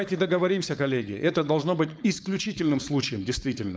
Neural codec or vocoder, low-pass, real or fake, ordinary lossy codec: codec, 16 kHz, 4.8 kbps, FACodec; none; fake; none